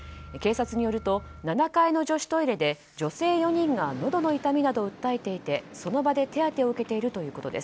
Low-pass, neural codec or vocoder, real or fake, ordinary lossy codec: none; none; real; none